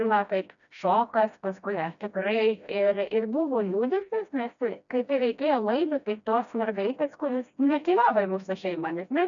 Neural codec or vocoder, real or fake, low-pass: codec, 16 kHz, 1 kbps, FreqCodec, smaller model; fake; 7.2 kHz